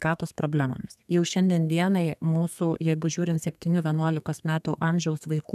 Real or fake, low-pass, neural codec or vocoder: fake; 14.4 kHz; codec, 32 kHz, 1.9 kbps, SNAC